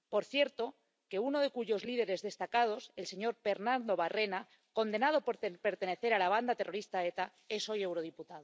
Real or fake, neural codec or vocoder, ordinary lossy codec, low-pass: real; none; none; none